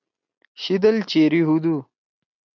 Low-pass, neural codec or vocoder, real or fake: 7.2 kHz; none; real